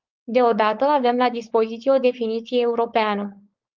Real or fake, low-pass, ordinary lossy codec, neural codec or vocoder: fake; 7.2 kHz; Opus, 24 kbps; codec, 16 kHz, 4.8 kbps, FACodec